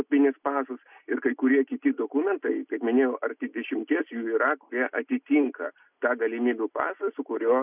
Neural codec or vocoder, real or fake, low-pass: none; real; 3.6 kHz